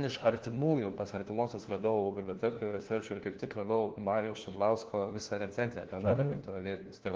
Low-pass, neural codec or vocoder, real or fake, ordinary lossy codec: 7.2 kHz; codec, 16 kHz, 1 kbps, FunCodec, trained on LibriTTS, 50 frames a second; fake; Opus, 16 kbps